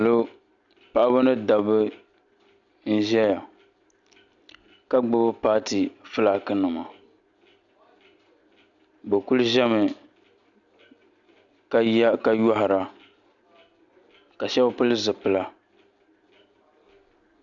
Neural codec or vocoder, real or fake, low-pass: none; real; 7.2 kHz